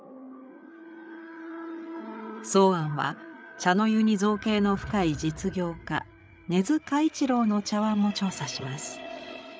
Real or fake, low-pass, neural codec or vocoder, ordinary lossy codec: fake; none; codec, 16 kHz, 8 kbps, FreqCodec, larger model; none